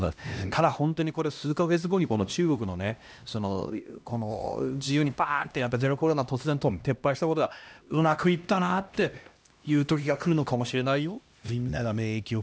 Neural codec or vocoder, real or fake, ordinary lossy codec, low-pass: codec, 16 kHz, 1 kbps, X-Codec, HuBERT features, trained on LibriSpeech; fake; none; none